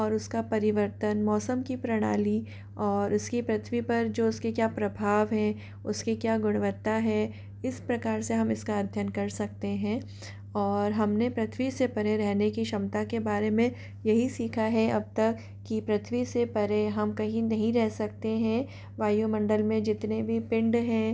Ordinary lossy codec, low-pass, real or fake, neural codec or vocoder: none; none; real; none